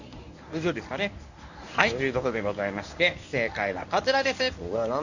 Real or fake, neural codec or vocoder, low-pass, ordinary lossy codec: fake; codec, 24 kHz, 0.9 kbps, WavTokenizer, medium speech release version 1; 7.2 kHz; none